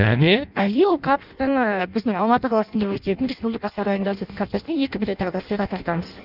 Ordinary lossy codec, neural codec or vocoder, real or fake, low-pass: none; codec, 16 kHz in and 24 kHz out, 0.6 kbps, FireRedTTS-2 codec; fake; 5.4 kHz